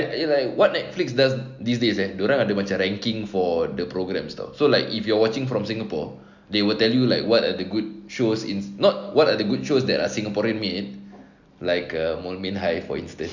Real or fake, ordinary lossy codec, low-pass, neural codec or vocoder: real; none; 7.2 kHz; none